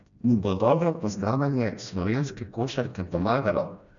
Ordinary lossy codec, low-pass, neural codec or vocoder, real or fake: none; 7.2 kHz; codec, 16 kHz, 1 kbps, FreqCodec, smaller model; fake